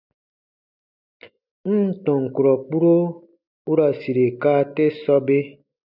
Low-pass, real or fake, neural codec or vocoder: 5.4 kHz; real; none